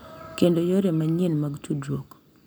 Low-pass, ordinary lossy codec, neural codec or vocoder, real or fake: none; none; none; real